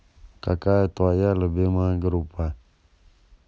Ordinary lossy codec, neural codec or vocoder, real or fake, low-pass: none; none; real; none